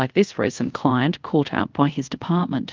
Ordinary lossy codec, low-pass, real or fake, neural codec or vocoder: Opus, 24 kbps; 7.2 kHz; fake; codec, 24 kHz, 0.5 kbps, DualCodec